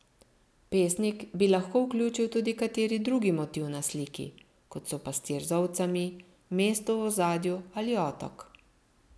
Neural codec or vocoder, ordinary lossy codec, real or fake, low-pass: none; none; real; none